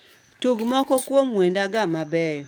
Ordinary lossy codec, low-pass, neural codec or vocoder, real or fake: none; none; codec, 44.1 kHz, 7.8 kbps, DAC; fake